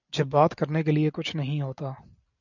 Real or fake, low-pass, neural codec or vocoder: real; 7.2 kHz; none